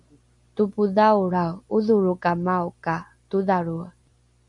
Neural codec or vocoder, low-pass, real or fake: none; 10.8 kHz; real